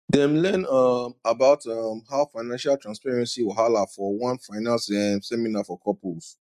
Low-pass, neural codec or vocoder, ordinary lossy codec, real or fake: 14.4 kHz; none; none; real